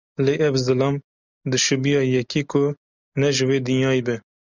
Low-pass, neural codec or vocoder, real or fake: 7.2 kHz; none; real